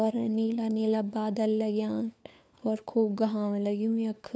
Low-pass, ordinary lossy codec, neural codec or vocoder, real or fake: none; none; codec, 16 kHz, 4 kbps, FunCodec, trained on LibriTTS, 50 frames a second; fake